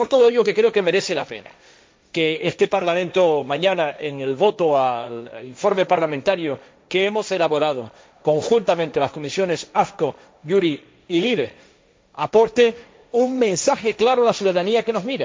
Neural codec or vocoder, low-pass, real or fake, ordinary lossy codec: codec, 16 kHz, 1.1 kbps, Voila-Tokenizer; none; fake; none